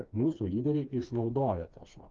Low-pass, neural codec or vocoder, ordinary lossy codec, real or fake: 7.2 kHz; codec, 16 kHz, 2 kbps, FreqCodec, smaller model; Opus, 32 kbps; fake